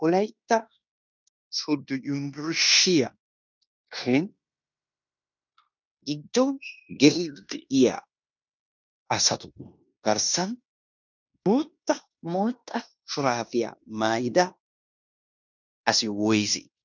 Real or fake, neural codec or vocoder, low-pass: fake; codec, 16 kHz in and 24 kHz out, 0.9 kbps, LongCat-Audio-Codec, fine tuned four codebook decoder; 7.2 kHz